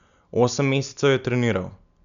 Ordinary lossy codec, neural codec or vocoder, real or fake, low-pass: none; none; real; 7.2 kHz